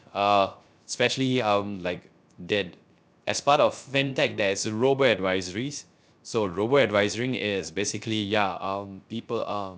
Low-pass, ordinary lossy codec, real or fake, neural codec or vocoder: none; none; fake; codec, 16 kHz, 0.3 kbps, FocalCodec